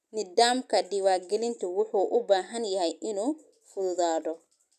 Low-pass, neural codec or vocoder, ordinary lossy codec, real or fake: 14.4 kHz; none; none; real